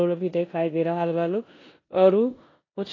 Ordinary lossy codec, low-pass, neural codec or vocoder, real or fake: AAC, 32 kbps; 7.2 kHz; codec, 16 kHz in and 24 kHz out, 0.9 kbps, LongCat-Audio-Codec, four codebook decoder; fake